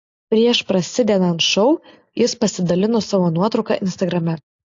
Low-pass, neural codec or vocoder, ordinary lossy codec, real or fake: 7.2 kHz; none; AAC, 48 kbps; real